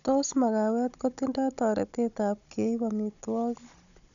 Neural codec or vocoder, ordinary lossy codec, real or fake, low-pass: codec, 16 kHz, 16 kbps, FunCodec, trained on Chinese and English, 50 frames a second; none; fake; 7.2 kHz